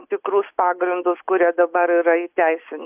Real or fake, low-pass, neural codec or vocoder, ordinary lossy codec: real; 3.6 kHz; none; AAC, 32 kbps